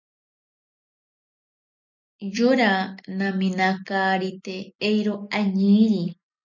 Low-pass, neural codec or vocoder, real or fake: 7.2 kHz; none; real